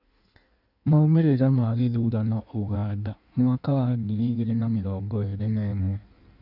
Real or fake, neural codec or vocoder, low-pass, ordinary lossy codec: fake; codec, 16 kHz in and 24 kHz out, 1.1 kbps, FireRedTTS-2 codec; 5.4 kHz; none